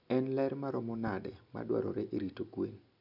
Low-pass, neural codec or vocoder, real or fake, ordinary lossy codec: 5.4 kHz; none; real; none